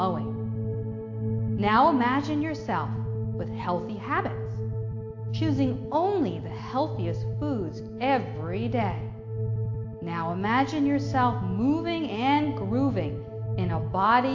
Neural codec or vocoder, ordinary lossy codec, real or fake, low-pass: none; AAC, 48 kbps; real; 7.2 kHz